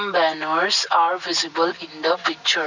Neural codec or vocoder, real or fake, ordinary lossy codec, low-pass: none; real; none; 7.2 kHz